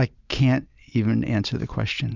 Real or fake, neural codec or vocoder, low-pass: real; none; 7.2 kHz